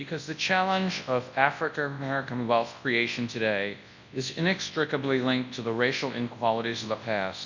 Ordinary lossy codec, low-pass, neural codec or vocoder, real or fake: MP3, 64 kbps; 7.2 kHz; codec, 24 kHz, 0.9 kbps, WavTokenizer, large speech release; fake